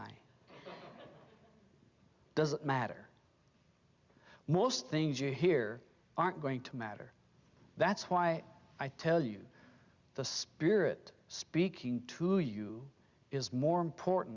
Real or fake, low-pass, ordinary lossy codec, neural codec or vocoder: real; 7.2 kHz; Opus, 64 kbps; none